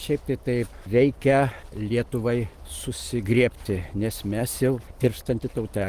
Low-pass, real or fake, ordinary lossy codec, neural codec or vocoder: 14.4 kHz; real; Opus, 24 kbps; none